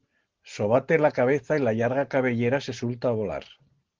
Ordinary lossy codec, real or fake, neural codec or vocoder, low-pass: Opus, 16 kbps; real; none; 7.2 kHz